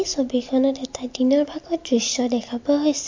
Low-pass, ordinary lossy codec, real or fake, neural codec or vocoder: 7.2 kHz; MP3, 48 kbps; real; none